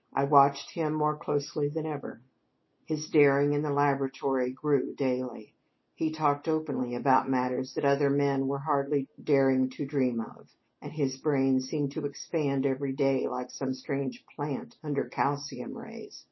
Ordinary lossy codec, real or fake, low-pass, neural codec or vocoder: MP3, 24 kbps; real; 7.2 kHz; none